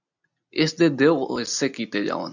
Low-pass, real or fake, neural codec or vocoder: 7.2 kHz; real; none